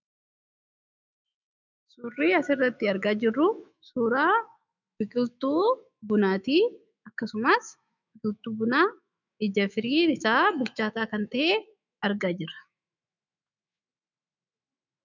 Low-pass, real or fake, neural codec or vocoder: 7.2 kHz; fake; autoencoder, 48 kHz, 128 numbers a frame, DAC-VAE, trained on Japanese speech